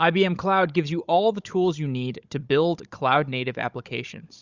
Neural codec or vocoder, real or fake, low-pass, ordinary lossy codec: codec, 16 kHz, 16 kbps, FunCodec, trained on Chinese and English, 50 frames a second; fake; 7.2 kHz; Opus, 64 kbps